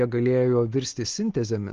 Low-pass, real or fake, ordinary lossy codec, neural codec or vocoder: 7.2 kHz; real; Opus, 16 kbps; none